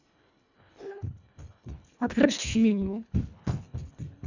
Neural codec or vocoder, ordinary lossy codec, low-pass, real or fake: codec, 24 kHz, 1.5 kbps, HILCodec; none; 7.2 kHz; fake